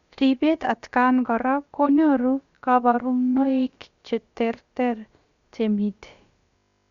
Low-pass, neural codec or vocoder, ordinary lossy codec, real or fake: 7.2 kHz; codec, 16 kHz, about 1 kbps, DyCAST, with the encoder's durations; Opus, 64 kbps; fake